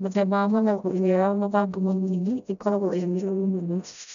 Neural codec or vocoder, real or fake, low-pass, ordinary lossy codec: codec, 16 kHz, 0.5 kbps, FreqCodec, smaller model; fake; 7.2 kHz; none